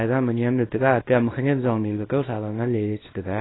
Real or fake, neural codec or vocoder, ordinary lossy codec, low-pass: fake; codec, 24 kHz, 0.9 kbps, WavTokenizer, small release; AAC, 16 kbps; 7.2 kHz